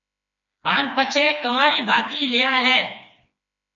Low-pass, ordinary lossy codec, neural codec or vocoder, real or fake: 7.2 kHz; MP3, 96 kbps; codec, 16 kHz, 2 kbps, FreqCodec, smaller model; fake